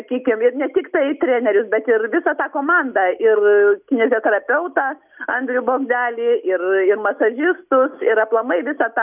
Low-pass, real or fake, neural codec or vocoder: 3.6 kHz; real; none